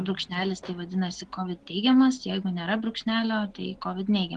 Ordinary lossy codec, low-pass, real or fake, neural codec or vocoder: Opus, 16 kbps; 10.8 kHz; real; none